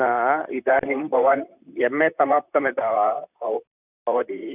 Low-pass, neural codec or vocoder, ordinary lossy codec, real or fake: 3.6 kHz; vocoder, 44.1 kHz, 128 mel bands, Pupu-Vocoder; none; fake